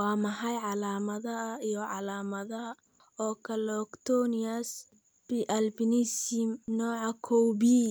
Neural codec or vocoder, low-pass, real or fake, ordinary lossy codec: none; none; real; none